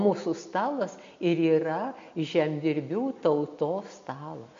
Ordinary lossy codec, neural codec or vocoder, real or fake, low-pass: MP3, 48 kbps; none; real; 7.2 kHz